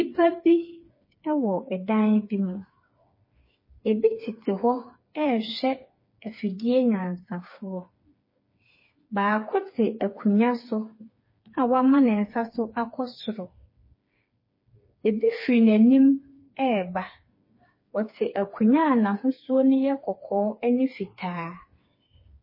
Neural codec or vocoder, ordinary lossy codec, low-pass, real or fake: codec, 16 kHz, 4 kbps, FreqCodec, smaller model; MP3, 24 kbps; 5.4 kHz; fake